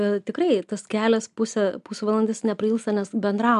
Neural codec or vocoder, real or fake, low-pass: none; real; 10.8 kHz